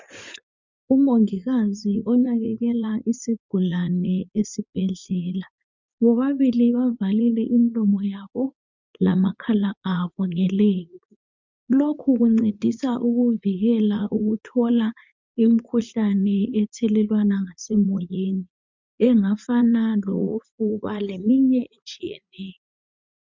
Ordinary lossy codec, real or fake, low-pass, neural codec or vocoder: MP3, 64 kbps; fake; 7.2 kHz; vocoder, 44.1 kHz, 80 mel bands, Vocos